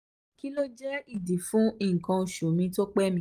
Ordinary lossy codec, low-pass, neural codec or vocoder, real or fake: Opus, 32 kbps; 14.4 kHz; none; real